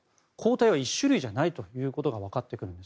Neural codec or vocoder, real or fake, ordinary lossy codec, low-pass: none; real; none; none